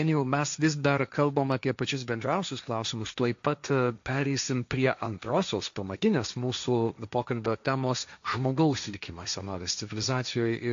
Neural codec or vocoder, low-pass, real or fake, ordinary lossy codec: codec, 16 kHz, 1.1 kbps, Voila-Tokenizer; 7.2 kHz; fake; AAC, 64 kbps